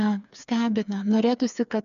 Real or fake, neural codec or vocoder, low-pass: fake; codec, 16 kHz, 4 kbps, FreqCodec, smaller model; 7.2 kHz